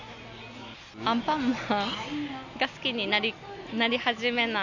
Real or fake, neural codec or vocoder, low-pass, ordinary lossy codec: real; none; 7.2 kHz; none